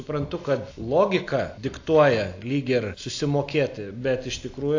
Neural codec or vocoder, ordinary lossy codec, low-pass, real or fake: none; AAC, 48 kbps; 7.2 kHz; real